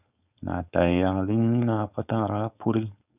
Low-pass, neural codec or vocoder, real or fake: 3.6 kHz; codec, 16 kHz, 4.8 kbps, FACodec; fake